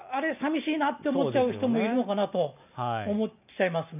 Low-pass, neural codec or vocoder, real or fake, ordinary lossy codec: 3.6 kHz; none; real; none